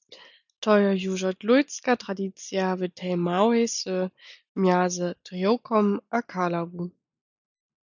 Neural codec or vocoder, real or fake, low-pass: none; real; 7.2 kHz